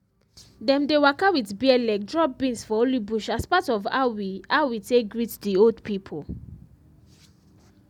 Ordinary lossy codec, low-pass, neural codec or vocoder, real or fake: none; 19.8 kHz; none; real